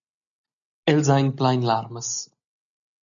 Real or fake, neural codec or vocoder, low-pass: real; none; 7.2 kHz